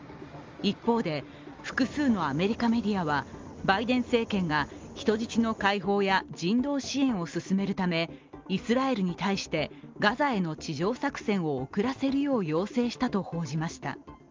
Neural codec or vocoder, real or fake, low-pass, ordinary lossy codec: none; real; 7.2 kHz; Opus, 32 kbps